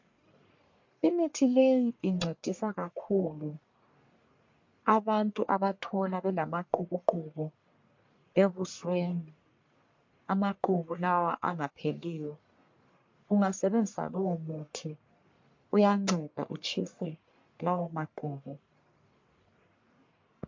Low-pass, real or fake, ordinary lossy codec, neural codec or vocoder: 7.2 kHz; fake; MP3, 48 kbps; codec, 44.1 kHz, 1.7 kbps, Pupu-Codec